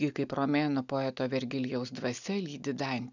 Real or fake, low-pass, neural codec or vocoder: real; 7.2 kHz; none